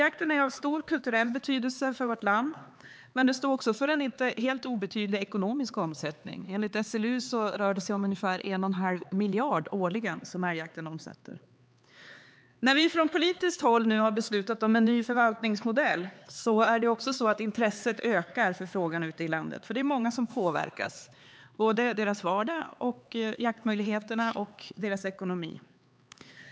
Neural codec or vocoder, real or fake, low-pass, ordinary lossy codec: codec, 16 kHz, 4 kbps, X-Codec, HuBERT features, trained on LibriSpeech; fake; none; none